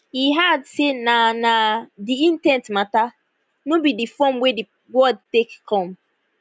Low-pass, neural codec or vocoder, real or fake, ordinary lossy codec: none; none; real; none